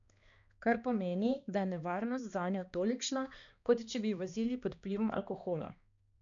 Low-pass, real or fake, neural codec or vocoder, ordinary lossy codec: 7.2 kHz; fake; codec, 16 kHz, 2 kbps, X-Codec, HuBERT features, trained on balanced general audio; none